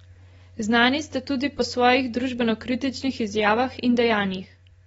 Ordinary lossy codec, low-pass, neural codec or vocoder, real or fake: AAC, 24 kbps; 10.8 kHz; none; real